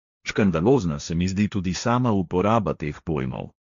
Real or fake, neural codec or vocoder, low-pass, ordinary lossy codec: fake; codec, 16 kHz, 1.1 kbps, Voila-Tokenizer; 7.2 kHz; MP3, 64 kbps